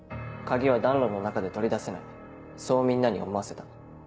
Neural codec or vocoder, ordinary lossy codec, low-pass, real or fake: none; none; none; real